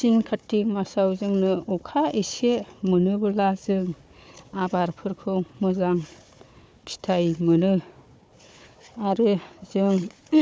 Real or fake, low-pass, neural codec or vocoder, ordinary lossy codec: fake; none; codec, 16 kHz, 4 kbps, FunCodec, trained on Chinese and English, 50 frames a second; none